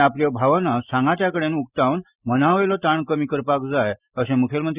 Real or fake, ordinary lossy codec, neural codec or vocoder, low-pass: real; Opus, 64 kbps; none; 3.6 kHz